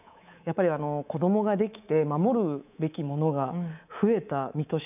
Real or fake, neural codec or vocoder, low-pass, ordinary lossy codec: fake; codec, 24 kHz, 3.1 kbps, DualCodec; 3.6 kHz; none